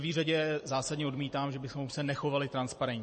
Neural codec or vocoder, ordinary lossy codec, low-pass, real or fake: vocoder, 44.1 kHz, 128 mel bands every 256 samples, BigVGAN v2; MP3, 32 kbps; 10.8 kHz; fake